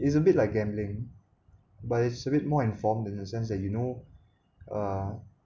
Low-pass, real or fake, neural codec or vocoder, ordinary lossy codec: 7.2 kHz; real; none; none